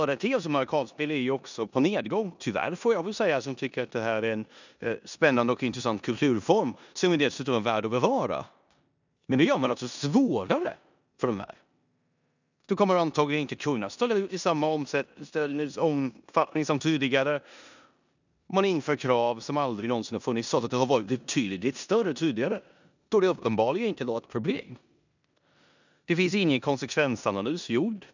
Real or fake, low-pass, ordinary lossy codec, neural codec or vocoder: fake; 7.2 kHz; none; codec, 16 kHz in and 24 kHz out, 0.9 kbps, LongCat-Audio-Codec, four codebook decoder